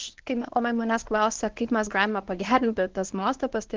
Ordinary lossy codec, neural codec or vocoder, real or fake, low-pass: Opus, 16 kbps; codec, 24 kHz, 0.9 kbps, WavTokenizer, medium speech release version 1; fake; 7.2 kHz